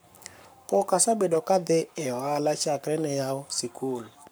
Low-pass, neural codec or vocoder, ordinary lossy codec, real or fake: none; codec, 44.1 kHz, 7.8 kbps, Pupu-Codec; none; fake